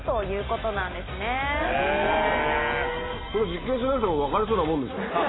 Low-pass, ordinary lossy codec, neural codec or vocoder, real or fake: 7.2 kHz; AAC, 16 kbps; none; real